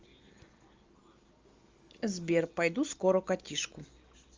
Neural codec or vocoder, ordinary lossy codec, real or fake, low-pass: none; Opus, 32 kbps; real; 7.2 kHz